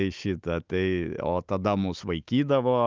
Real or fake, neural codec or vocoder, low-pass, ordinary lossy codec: fake; autoencoder, 48 kHz, 128 numbers a frame, DAC-VAE, trained on Japanese speech; 7.2 kHz; Opus, 32 kbps